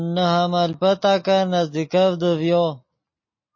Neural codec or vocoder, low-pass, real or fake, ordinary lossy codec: none; 7.2 kHz; real; MP3, 32 kbps